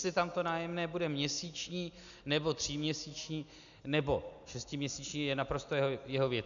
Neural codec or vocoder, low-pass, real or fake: none; 7.2 kHz; real